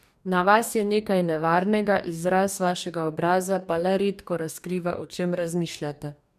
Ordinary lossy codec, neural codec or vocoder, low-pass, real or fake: none; codec, 44.1 kHz, 2.6 kbps, DAC; 14.4 kHz; fake